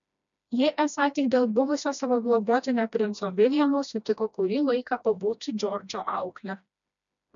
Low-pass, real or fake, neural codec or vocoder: 7.2 kHz; fake; codec, 16 kHz, 1 kbps, FreqCodec, smaller model